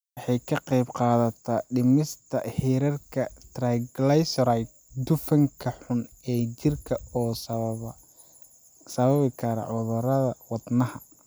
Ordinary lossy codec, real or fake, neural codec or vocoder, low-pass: none; real; none; none